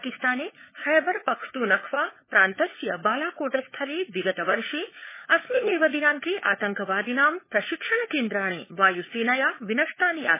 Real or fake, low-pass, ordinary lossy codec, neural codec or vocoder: fake; 3.6 kHz; MP3, 16 kbps; codec, 16 kHz, 4 kbps, FunCodec, trained on LibriTTS, 50 frames a second